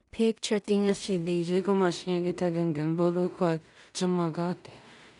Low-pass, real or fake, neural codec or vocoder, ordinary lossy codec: 10.8 kHz; fake; codec, 16 kHz in and 24 kHz out, 0.4 kbps, LongCat-Audio-Codec, two codebook decoder; none